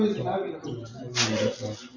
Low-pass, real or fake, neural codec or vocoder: 7.2 kHz; real; none